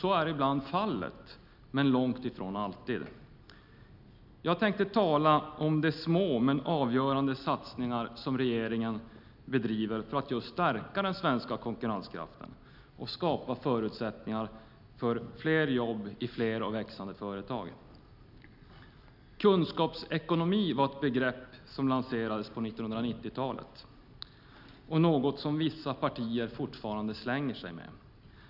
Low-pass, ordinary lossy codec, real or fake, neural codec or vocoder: 5.4 kHz; none; real; none